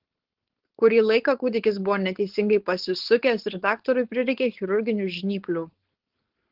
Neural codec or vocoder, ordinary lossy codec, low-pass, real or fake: codec, 16 kHz, 4.8 kbps, FACodec; Opus, 16 kbps; 5.4 kHz; fake